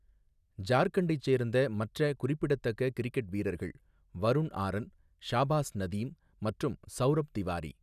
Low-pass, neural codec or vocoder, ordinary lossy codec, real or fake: 14.4 kHz; none; none; real